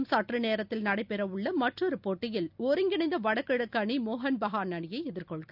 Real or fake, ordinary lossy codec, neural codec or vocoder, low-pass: real; none; none; 5.4 kHz